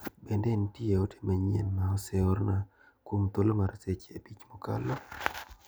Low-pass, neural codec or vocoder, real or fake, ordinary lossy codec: none; none; real; none